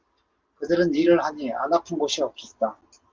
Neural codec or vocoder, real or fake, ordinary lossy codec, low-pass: none; real; Opus, 32 kbps; 7.2 kHz